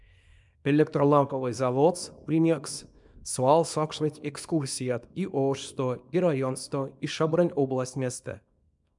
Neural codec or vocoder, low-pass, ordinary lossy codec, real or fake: codec, 24 kHz, 0.9 kbps, WavTokenizer, small release; 10.8 kHz; MP3, 96 kbps; fake